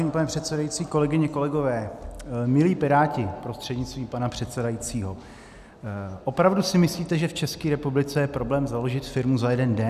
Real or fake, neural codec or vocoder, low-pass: real; none; 14.4 kHz